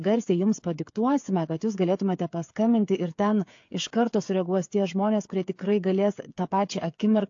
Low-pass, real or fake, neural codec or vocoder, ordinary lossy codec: 7.2 kHz; fake; codec, 16 kHz, 8 kbps, FreqCodec, smaller model; AAC, 48 kbps